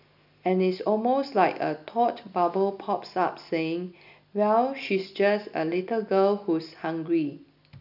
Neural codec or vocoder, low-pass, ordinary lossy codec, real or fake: none; 5.4 kHz; none; real